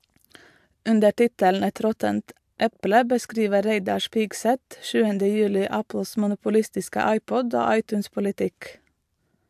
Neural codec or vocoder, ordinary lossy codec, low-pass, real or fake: vocoder, 44.1 kHz, 128 mel bands every 512 samples, BigVGAN v2; AAC, 96 kbps; 14.4 kHz; fake